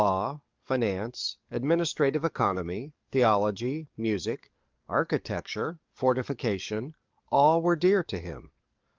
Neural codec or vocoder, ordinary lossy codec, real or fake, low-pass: codec, 44.1 kHz, 7.8 kbps, DAC; Opus, 24 kbps; fake; 7.2 kHz